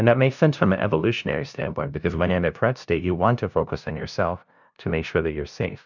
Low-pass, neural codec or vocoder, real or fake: 7.2 kHz; codec, 16 kHz, 0.5 kbps, FunCodec, trained on LibriTTS, 25 frames a second; fake